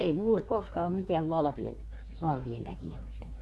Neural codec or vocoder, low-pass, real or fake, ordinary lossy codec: codec, 24 kHz, 1 kbps, SNAC; none; fake; none